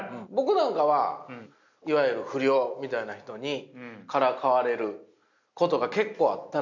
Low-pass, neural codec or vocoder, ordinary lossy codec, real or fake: 7.2 kHz; none; none; real